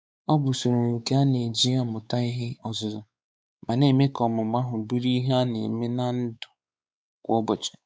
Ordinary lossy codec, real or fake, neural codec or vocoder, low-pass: none; fake; codec, 16 kHz, 4 kbps, X-Codec, WavLM features, trained on Multilingual LibriSpeech; none